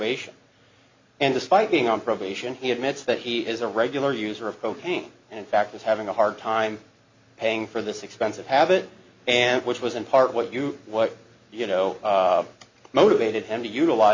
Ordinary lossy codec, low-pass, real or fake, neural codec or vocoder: MP3, 48 kbps; 7.2 kHz; real; none